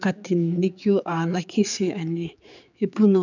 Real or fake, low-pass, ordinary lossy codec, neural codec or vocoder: fake; 7.2 kHz; none; codec, 16 kHz, 4 kbps, X-Codec, HuBERT features, trained on general audio